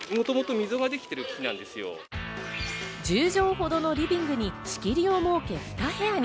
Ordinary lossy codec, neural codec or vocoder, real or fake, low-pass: none; none; real; none